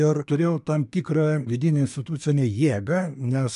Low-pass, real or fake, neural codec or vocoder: 10.8 kHz; fake; codec, 24 kHz, 1 kbps, SNAC